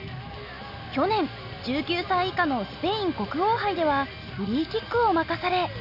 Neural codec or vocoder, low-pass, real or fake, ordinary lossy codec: none; 5.4 kHz; real; none